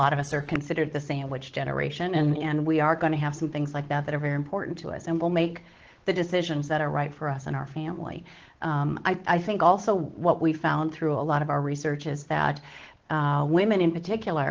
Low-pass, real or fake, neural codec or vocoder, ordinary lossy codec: 7.2 kHz; fake; codec, 16 kHz, 8 kbps, FunCodec, trained on Chinese and English, 25 frames a second; Opus, 16 kbps